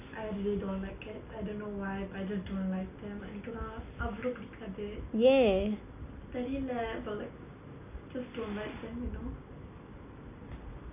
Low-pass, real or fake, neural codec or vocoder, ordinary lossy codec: 3.6 kHz; real; none; none